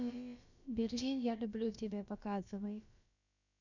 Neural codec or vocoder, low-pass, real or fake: codec, 16 kHz, about 1 kbps, DyCAST, with the encoder's durations; 7.2 kHz; fake